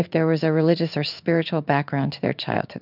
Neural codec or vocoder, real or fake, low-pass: codec, 16 kHz in and 24 kHz out, 1 kbps, XY-Tokenizer; fake; 5.4 kHz